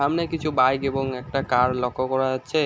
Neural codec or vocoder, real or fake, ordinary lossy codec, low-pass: none; real; none; none